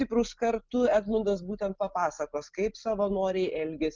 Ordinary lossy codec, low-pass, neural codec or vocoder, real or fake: Opus, 24 kbps; 7.2 kHz; none; real